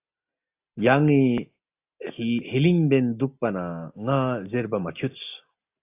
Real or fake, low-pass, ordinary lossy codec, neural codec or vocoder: real; 3.6 kHz; AAC, 32 kbps; none